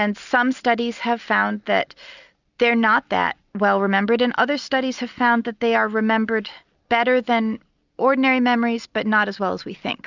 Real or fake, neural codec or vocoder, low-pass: real; none; 7.2 kHz